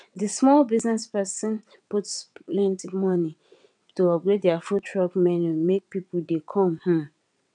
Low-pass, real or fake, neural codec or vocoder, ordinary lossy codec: 9.9 kHz; fake; vocoder, 22.05 kHz, 80 mel bands, WaveNeXt; none